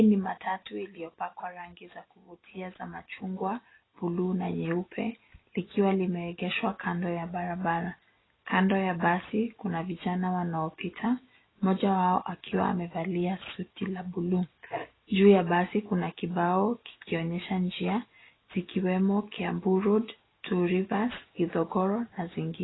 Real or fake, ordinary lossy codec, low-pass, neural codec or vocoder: real; AAC, 16 kbps; 7.2 kHz; none